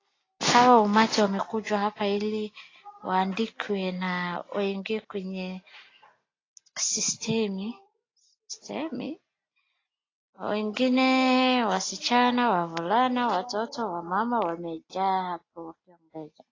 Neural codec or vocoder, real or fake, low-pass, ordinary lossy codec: none; real; 7.2 kHz; AAC, 32 kbps